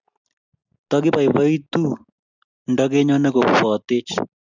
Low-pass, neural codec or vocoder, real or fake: 7.2 kHz; none; real